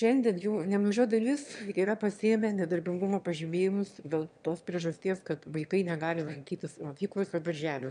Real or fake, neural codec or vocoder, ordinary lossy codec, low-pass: fake; autoencoder, 22.05 kHz, a latent of 192 numbers a frame, VITS, trained on one speaker; MP3, 96 kbps; 9.9 kHz